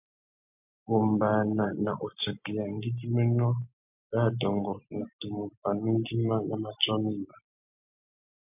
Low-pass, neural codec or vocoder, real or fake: 3.6 kHz; none; real